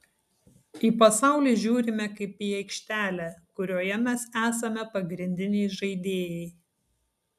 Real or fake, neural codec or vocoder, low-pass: real; none; 14.4 kHz